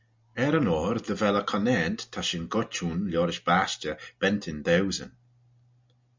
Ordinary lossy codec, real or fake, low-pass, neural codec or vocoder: MP3, 64 kbps; real; 7.2 kHz; none